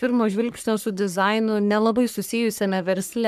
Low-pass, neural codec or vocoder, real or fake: 14.4 kHz; codec, 44.1 kHz, 3.4 kbps, Pupu-Codec; fake